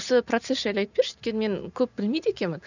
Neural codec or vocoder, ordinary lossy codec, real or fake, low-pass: none; none; real; 7.2 kHz